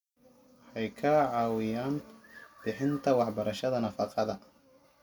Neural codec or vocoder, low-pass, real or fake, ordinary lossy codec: none; 19.8 kHz; real; none